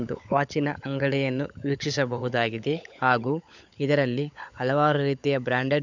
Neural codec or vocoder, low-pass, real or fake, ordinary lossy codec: codec, 16 kHz, 16 kbps, FunCodec, trained on LibriTTS, 50 frames a second; 7.2 kHz; fake; none